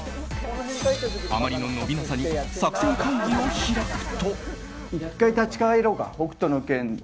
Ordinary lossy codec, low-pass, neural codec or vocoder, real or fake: none; none; none; real